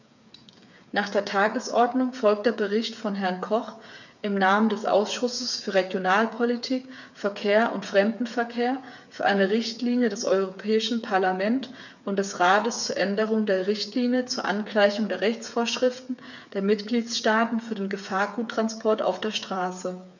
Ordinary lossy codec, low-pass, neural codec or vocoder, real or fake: none; 7.2 kHz; codec, 16 kHz, 8 kbps, FreqCodec, smaller model; fake